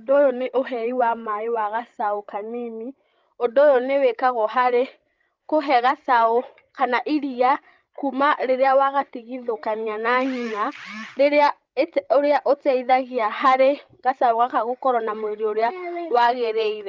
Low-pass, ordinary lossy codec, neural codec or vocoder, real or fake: 7.2 kHz; Opus, 24 kbps; codec, 16 kHz, 16 kbps, FreqCodec, larger model; fake